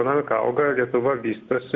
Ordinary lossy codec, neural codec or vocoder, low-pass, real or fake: Opus, 64 kbps; none; 7.2 kHz; real